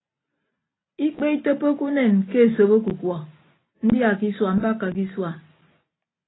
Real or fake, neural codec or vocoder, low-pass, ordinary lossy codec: real; none; 7.2 kHz; AAC, 16 kbps